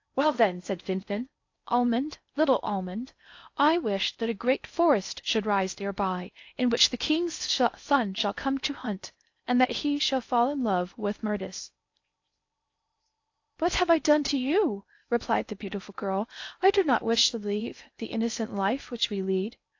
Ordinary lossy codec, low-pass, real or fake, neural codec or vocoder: AAC, 48 kbps; 7.2 kHz; fake; codec, 16 kHz in and 24 kHz out, 0.6 kbps, FocalCodec, streaming, 4096 codes